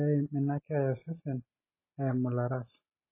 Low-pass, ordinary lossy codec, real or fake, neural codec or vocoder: 3.6 kHz; MP3, 16 kbps; real; none